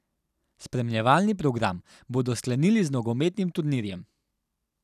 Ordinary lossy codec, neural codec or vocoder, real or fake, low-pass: none; none; real; 14.4 kHz